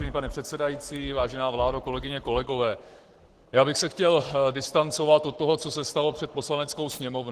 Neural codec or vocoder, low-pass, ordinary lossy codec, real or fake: codec, 44.1 kHz, 7.8 kbps, Pupu-Codec; 14.4 kHz; Opus, 16 kbps; fake